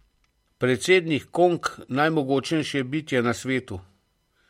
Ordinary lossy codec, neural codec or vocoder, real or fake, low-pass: MP3, 64 kbps; none; real; 19.8 kHz